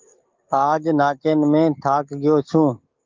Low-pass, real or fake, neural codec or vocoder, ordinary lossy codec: 7.2 kHz; fake; codec, 44.1 kHz, 7.8 kbps, DAC; Opus, 24 kbps